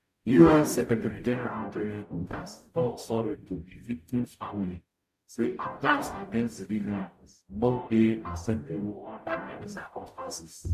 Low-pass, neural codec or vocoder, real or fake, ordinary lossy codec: 14.4 kHz; codec, 44.1 kHz, 0.9 kbps, DAC; fake; MP3, 64 kbps